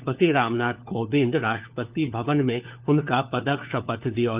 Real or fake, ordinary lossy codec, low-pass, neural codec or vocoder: fake; Opus, 24 kbps; 3.6 kHz; codec, 16 kHz, 4 kbps, FunCodec, trained on LibriTTS, 50 frames a second